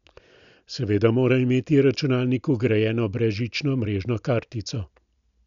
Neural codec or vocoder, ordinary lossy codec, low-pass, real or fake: none; none; 7.2 kHz; real